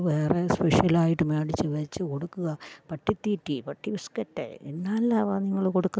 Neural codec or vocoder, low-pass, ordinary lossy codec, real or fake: none; none; none; real